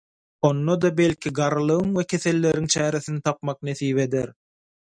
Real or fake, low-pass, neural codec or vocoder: real; 9.9 kHz; none